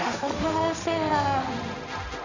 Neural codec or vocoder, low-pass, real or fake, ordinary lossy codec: codec, 16 kHz, 1.1 kbps, Voila-Tokenizer; 7.2 kHz; fake; none